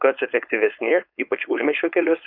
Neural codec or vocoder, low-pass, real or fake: codec, 16 kHz, 4.8 kbps, FACodec; 5.4 kHz; fake